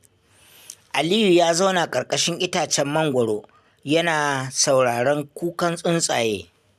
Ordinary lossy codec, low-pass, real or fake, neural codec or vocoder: none; 14.4 kHz; real; none